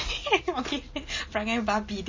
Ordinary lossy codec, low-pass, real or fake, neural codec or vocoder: MP3, 32 kbps; 7.2 kHz; real; none